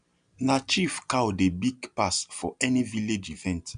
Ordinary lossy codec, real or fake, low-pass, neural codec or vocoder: none; real; 9.9 kHz; none